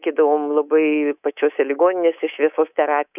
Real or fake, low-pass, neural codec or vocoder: fake; 3.6 kHz; codec, 24 kHz, 3.1 kbps, DualCodec